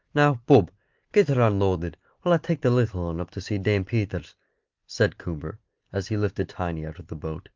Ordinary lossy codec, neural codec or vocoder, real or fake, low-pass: Opus, 24 kbps; none; real; 7.2 kHz